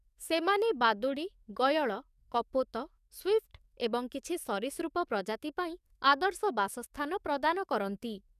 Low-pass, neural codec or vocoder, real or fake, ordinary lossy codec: 14.4 kHz; codec, 44.1 kHz, 7.8 kbps, DAC; fake; none